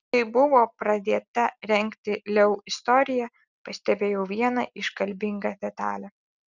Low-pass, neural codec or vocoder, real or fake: 7.2 kHz; none; real